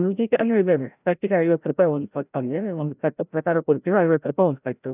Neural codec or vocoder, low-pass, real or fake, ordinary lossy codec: codec, 16 kHz, 0.5 kbps, FreqCodec, larger model; 3.6 kHz; fake; none